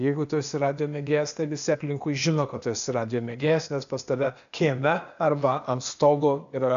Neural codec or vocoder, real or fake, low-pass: codec, 16 kHz, 0.8 kbps, ZipCodec; fake; 7.2 kHz